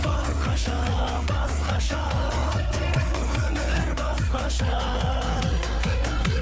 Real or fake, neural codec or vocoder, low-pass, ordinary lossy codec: fake; codec, 16 kHz, 4 kbps, FreqCodec, larger model; none; none